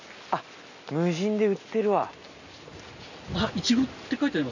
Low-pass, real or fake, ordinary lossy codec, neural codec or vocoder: 7.2 kHz; real; none; none